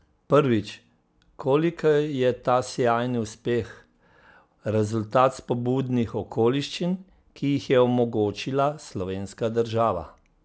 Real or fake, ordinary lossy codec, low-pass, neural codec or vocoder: real; none; none; none